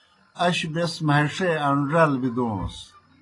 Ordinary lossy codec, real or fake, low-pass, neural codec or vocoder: AAC, 32 kbps; real; 10.8 kHz; none